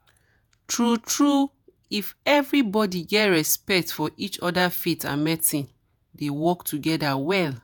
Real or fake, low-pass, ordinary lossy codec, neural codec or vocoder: fake; none; none; vocoder, 48 kHz, 128 mel bands, Vocos